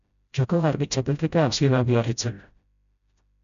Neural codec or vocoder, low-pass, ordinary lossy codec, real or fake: codec, 16 kHz, 0.5 kbps, FreqCodec, smaller model; 7.2 kHz; MP3, 96 kbps; fake